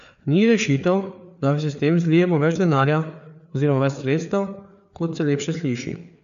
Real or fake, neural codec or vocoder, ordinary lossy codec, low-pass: fake; codec, 16 kHz, 4 kbps, FreqCodec, larger model; none; 7.2 kHz